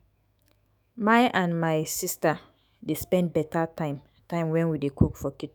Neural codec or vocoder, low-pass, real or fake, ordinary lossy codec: autoencoder, 48 kHz, 128 numbers a frame, DAC-VAE, trained on Japanese speech; none; fake; none